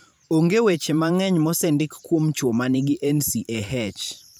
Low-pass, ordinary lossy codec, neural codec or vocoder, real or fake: none; none; vocoder, 44.1 kHz, 128 mel bands every 512 samples, BigVGAN v2; fake